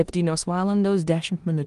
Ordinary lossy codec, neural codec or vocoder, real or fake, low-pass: Opus, 24 kbps; codec, 16 kHz in and 24 kHz out, 0.9 kbps, LongCat-Audio-Codec, four codebook decoder; fake; 10.8 kHz